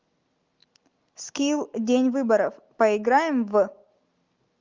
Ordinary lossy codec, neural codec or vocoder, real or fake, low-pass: Opus, 24 kbps; none; real; 7.2 kHz